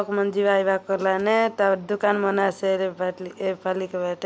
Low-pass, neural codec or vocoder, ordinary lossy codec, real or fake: none; none; none; real